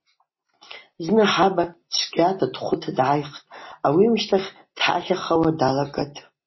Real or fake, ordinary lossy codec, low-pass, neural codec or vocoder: real; MP3, 24 kbps; 7.2 kHz; none